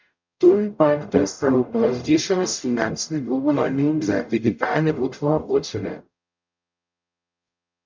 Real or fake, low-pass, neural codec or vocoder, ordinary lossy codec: fake; 7.2 kHz; codec, 44.1 kHz, 0.9 kbps, DAC; MP3, 64 kbps